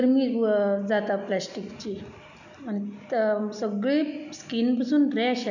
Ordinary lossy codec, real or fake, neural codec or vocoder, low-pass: none; real; none; 7.2 kHz